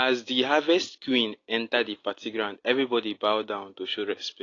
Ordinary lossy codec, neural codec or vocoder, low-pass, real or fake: AAC, 32 kbps; none; 7.2 kHz; real